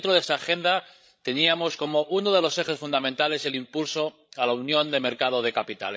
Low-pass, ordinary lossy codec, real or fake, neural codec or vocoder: none; none; fake; codec, 16 kHz, 16 kbps, FreqCodec, larger model